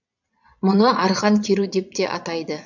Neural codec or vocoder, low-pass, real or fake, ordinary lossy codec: vocoder, 22.05 kHz, 80 mel bands, Vocos; 7.2 kHz; fake; none